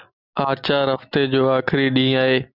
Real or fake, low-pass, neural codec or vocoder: real; 5.4 kHz; none